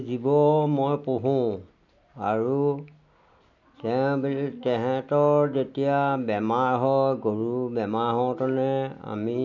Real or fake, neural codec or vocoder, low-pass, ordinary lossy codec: real; none; 7.2 kHz; none